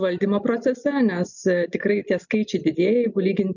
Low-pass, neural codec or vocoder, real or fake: 7.2 kHz; none; real